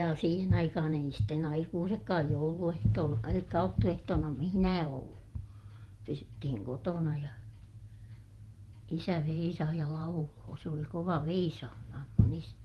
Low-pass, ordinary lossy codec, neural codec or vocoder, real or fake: 19.8 kHz; Opus, 24 kbps; vocoder, 48 kHz, 128 mel bands, Vocos; fake